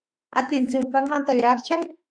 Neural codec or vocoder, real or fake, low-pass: autoencoder, 48 kHz, 32 numbers a frame, DAC-VAE, trained on Japanese speech; fake; 9.9 kHz